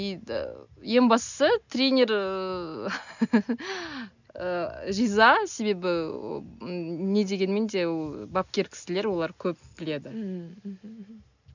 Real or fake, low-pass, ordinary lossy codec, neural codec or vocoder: real; 7.2 kHz; none; none